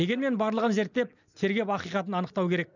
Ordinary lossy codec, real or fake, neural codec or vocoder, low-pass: none; real; none; 7.2 kHz